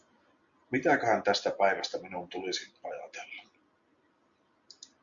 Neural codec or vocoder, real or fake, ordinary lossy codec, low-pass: none; real; Opus, 64 kbps; 7.2 kHz